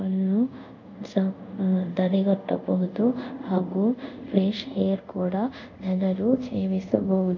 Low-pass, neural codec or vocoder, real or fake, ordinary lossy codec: 7.2 kHz; codec, 24 kHz, 0.5 kbps, DualCodec; fake; MP3, 64 kbps